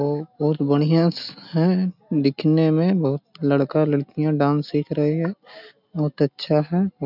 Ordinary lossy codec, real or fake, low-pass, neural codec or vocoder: none; real; 5.4 kHz; none